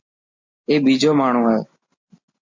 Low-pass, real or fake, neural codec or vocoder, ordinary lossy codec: 7.2 kHz; real; none; MP3, 64 kbps